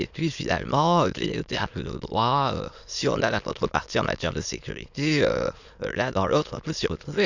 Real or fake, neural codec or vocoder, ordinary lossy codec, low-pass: fake; autoencoder, 22.05 kHz, a latent of 192 numbers a frame, VITS, trained on many speakers; none; 7.2 kHz